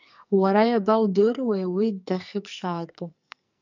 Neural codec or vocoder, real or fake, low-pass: codec, 44.1 kHz, 2.6 kbps, SNAC; fake; 7.2 kHz